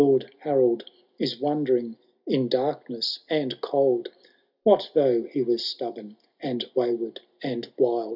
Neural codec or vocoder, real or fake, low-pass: none; real; 5.4 kHz